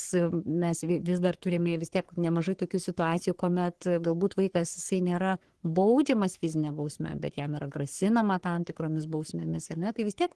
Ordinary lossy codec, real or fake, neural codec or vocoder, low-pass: Opus, 16 kbps; fake; codec, 44.1 kHz, 3.4 kbps, Pupu-Codec; 10.8 kHz